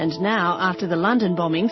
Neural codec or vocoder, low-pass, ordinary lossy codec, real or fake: none; 7.2 kHz; MP3, 24 kbps; real